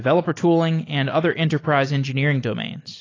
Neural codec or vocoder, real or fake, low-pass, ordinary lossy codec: none; real; 7.2 kHz; AAC, 32 kbps